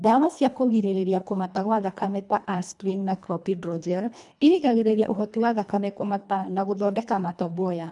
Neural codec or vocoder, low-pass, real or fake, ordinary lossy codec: codec, 24 kHz, 1.5 kbps, HILCodec; 10.8 kHz; fake; none